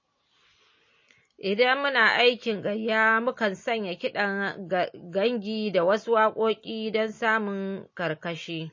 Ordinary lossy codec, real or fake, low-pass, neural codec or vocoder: MP3, 32 kbps; real; 7.2 kHz; none